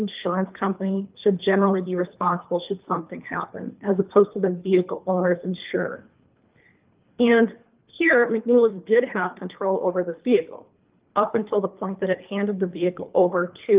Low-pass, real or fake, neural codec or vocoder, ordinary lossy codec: 3.6 kHz; fake; codec, 24 kHz, 3 kbps, HILCodec; Opus, 24 kbps